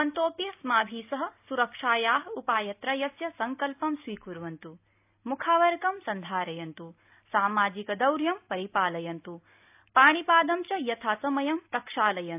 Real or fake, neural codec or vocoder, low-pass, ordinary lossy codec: real; none; 3.6 kHz; AAC, 32 kbps